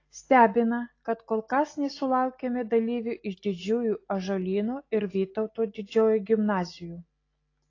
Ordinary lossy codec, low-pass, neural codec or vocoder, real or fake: AAC, 32 kbps; 7.2 kHz; none; real